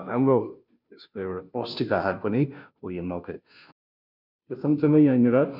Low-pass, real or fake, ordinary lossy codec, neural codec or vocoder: 5.4 kHz; fake; none; codec, 16 kHz, 0.5 kbps, FunCodec, trained on Chinese and English, 25 frames a second